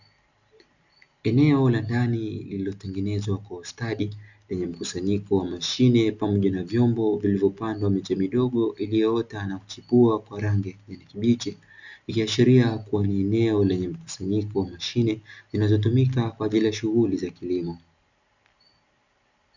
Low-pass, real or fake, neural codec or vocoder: 7.2 kHz; real; none